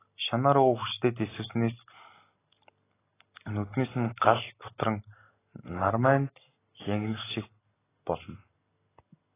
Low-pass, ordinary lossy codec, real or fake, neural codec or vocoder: 3.6 kHz; AAC, 16 kbps; real; none